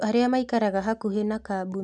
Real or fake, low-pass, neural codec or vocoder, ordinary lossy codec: real; 10.8 kHz; none; none